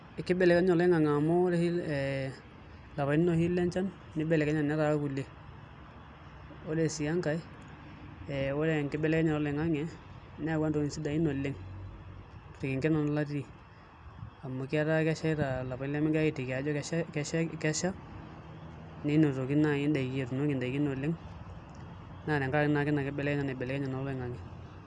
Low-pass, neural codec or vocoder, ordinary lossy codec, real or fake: 9.9 kHz; none; none; real